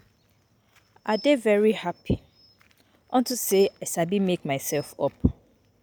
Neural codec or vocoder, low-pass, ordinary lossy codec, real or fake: none; none; none; real